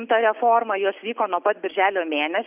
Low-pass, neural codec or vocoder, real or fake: 3.6 kHz; none; real